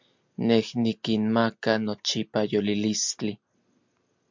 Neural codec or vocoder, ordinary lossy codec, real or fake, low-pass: none; MP3, 64 kbps; real; 7.2 kHz